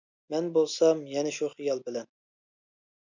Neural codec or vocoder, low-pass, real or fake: none; 7.2 kHz; real